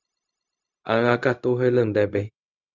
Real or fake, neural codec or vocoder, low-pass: fake; codec, 16 kHz, 0.4 kbps, LongCat-Audio-Codec; 7.2 kHz